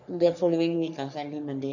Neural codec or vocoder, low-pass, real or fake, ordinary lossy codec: codec, 44.1 kHz, 3.4 kbps, Pupu-Codec; 7.2 kHz; fake; none